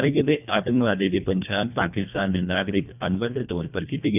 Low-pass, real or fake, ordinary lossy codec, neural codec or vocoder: 3.6 kHz; fake; none; codec, 24 kHz, 1.5 kbps, HILCodec